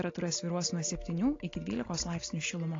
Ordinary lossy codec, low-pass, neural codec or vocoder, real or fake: AAC, 32 kbps; 7.2 kHz; none; real